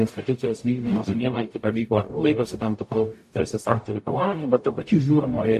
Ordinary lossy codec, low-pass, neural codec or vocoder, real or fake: AAC, 64 kbps; 14.4 kHz; codec, 44.1 kHz, 0.9 kbps, DAC; fake